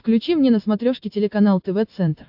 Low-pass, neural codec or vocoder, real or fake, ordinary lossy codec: 5.4 kHz; none; real; AAC, 48 kbps